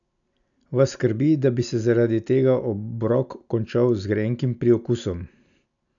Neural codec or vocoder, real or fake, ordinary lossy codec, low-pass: none; real; none; 7.2 kHz